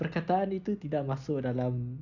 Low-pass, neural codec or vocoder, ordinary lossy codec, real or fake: 7.2 kHz; none; none; real